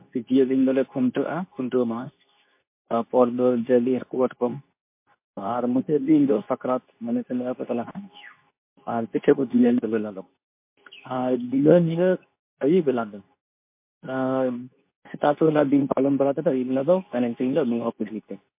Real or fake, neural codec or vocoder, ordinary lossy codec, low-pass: fake; codec, 24 kHz, 0.9 kbps, WavTokenizer, medium speech release version 2; MP3, 24 kbps; 3.6 kHz